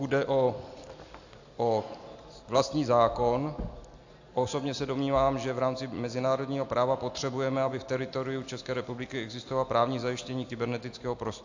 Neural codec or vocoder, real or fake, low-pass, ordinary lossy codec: none; real; 7.2 kHz; AAC, 48 kbps